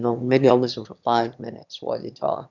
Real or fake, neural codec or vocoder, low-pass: fake; autoencoder, 22.05 kHz, a latent of 192 numbers a frame, VITS, trained on one speaker; 7.2 kHz